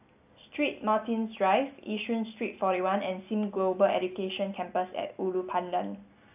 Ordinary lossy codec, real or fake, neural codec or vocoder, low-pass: AAC, 32 kbps; real; none; 3.6 kHz